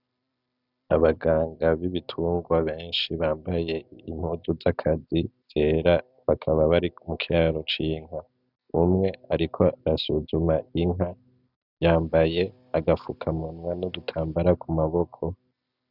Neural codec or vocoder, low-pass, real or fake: none; 5.4 kHz; real